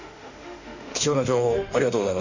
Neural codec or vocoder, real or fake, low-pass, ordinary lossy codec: autoencoder, 48 kHz, 32 numbers a frame, DAC-VAE, trained on Japanese speech; fake; 7.2 kHz; Opus, 64 kbps